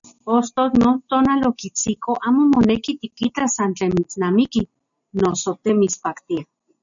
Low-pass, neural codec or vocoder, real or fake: 7.2 kHz; none; real